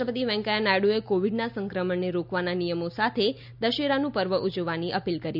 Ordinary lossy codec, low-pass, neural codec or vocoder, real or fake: none; 5.4 kHz; none; real